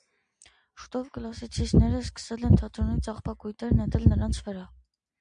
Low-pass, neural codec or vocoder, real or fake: 9.9 kHz; none; real